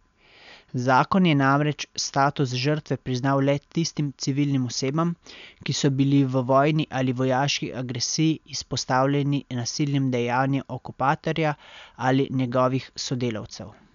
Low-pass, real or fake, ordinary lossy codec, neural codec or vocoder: 7.2 kHz; real; none; none